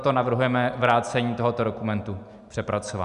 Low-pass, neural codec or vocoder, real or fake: 10.8 kHz; none; real